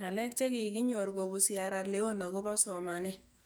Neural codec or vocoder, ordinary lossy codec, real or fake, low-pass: codec, 44.1 kHz, 2.6 kbps, SNAC; none; fake; none